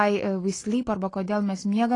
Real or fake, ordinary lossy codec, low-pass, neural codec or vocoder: real; AAC, 32 kbps; 10.8 kHz; none